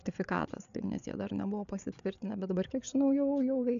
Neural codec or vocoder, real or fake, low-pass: codec, 16 kHz, 8 kbps, FreqCodec, larger model; fake; 7.2 kHz